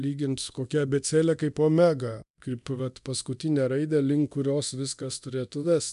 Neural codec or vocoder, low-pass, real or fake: codec, 24 kHz, 0.9 kbps, DualCodec; 10.8 kHz; fake